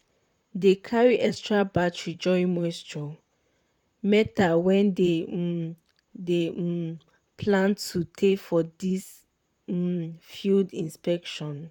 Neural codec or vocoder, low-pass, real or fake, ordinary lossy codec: vocoder, 44.1 kHz, 128 mel bands, Pupu-Vocoder; 19.8 kHz; fake; none